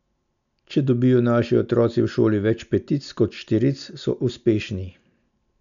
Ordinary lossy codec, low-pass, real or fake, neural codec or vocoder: none; 7.2 kHz; real; none